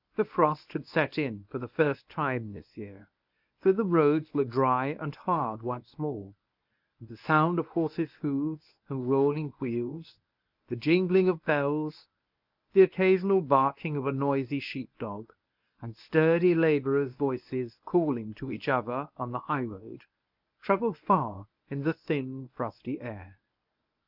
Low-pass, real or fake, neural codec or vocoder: 5.4 kHz; fake; codec, 24 kHz, 0.9 kbps, WavTokenizer, medium speech release version 1